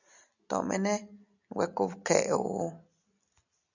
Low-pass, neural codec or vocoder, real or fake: 7.2 kHz; none; real